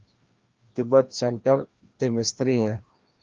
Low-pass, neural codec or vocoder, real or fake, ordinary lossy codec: 7.2 kHz; codec, 16 kHz, 1 kbps, FreqCodec, larger model; fake; Opus, 32 kbps